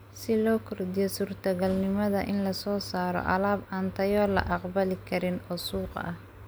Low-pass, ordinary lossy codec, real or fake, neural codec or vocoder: none; none; real; none